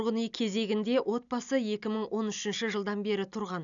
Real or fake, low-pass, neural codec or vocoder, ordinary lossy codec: real; 7.2 kHz; none; none